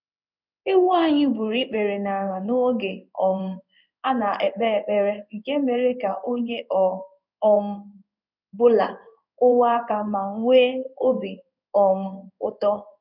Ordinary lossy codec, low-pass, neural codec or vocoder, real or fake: none; 5.4 kHz; codec, 16 kHz in and 24 kHz out, 1 kbps, XY-Tokenizer; fake